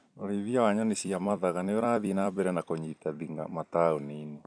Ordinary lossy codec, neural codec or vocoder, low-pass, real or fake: none; vocoder, 44.1 kHz, 128 mel bands every 256 samples, BigVGAN v2; 9.9 kHz; fake